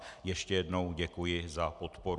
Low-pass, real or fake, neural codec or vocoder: 10.8 kHz; real; none